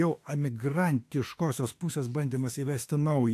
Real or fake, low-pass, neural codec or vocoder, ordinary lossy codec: fake; 14.4 kHz; autoencoder, 48 kHz, 32 numbers a frame, DAC-VAE, trained on Japanese speech; AAC, 64 kbps